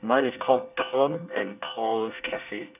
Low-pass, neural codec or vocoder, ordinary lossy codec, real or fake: 3.6 kHz; codec, 24 kHz, 1 kbps, SNAC; none; fake